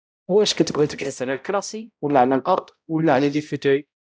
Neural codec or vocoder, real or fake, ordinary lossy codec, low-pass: codec, 16 kHz, 0.5 kbps, X-Codec, HuBERT features, trained on balanced general audio; fake; none; none